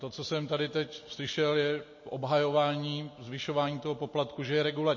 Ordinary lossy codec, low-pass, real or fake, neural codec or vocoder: MP3, 32 kbps; 7.2 kHz; real; none